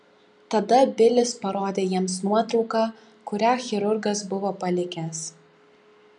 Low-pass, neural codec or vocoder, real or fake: 10.8 kHz; none; real